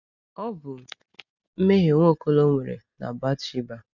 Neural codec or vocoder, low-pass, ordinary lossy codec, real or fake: none; 7.2 kHz; none; real